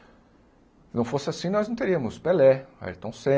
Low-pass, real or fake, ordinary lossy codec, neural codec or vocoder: none; real; none; none